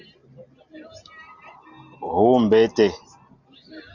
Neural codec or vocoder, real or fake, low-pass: none; real; 7.2 kHz